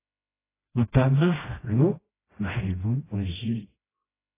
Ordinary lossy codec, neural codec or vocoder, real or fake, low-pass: AAC, 16 kbps; codec, 16 kHz, 1 kbps, FreqCodec, smaller model; fake; 3.6 kHz